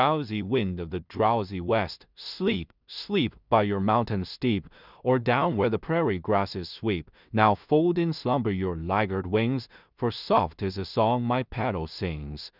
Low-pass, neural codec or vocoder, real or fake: 5.4 kHz; codec, 16 kHz in and 24 kHz out, 0.4 kbps, LongCat-Audio-Codec, two codebook decoder; fake